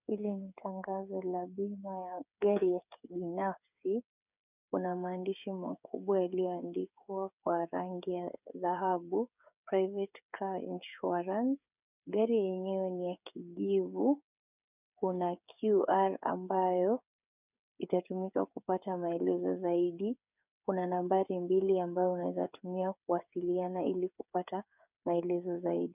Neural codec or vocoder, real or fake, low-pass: codec, 16 kHz, 8 kbps, FreqCodec, smaller model; fake; 3.6 kHz